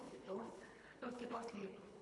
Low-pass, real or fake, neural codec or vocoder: 10.8 kHz; fake; codec, 24 kHz, 3 kbps, HILCodec